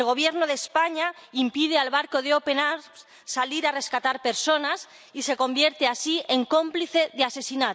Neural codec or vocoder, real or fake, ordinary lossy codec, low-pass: none; real; none; none